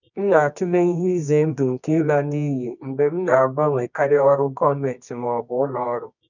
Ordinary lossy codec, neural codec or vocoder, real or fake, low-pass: none; codec, 24 kHz, 0.9 kbps, WavTokenizer, medium music audio release; fake; 7.2 kHz